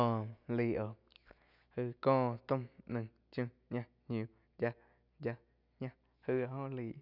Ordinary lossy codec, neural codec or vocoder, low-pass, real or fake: none; none; 5.4 kHz; real